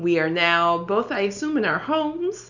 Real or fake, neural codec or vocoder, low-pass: real; none; 7.2 kHz